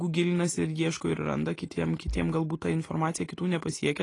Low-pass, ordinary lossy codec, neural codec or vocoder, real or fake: 10.8 kHz; AAC, 32 kbps; none; real